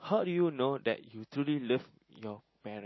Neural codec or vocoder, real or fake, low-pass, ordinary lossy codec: none; real; 7.2 kHz; MP3, 24 kbps